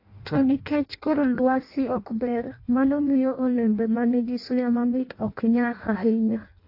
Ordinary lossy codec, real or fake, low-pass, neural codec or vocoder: AAC, 32 kbps; fake; 5.4 kHz; codec, 16 kHz in and 24 kHz out, 0.6 kbps, FireRedTTS-2 codec